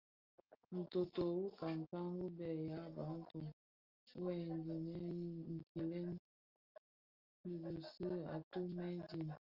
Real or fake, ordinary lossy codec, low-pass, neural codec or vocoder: real; Opus, 24 kbps; 5.4 kHz; none